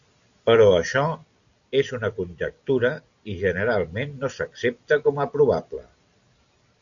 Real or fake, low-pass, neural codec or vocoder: real; 7.2 kHz; none